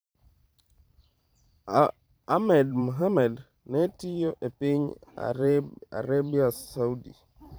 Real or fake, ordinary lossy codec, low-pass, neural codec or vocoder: fake; none; none; vocoder, 44.1 kHz, 128 mel bands every 512 samples, BigVGAN v2